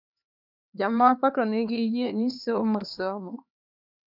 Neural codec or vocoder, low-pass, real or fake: codec, 16 kHz, 2 kbps, X-Codec, HuBERT features, trained on LibriSpeech; 5.4 kHz; fake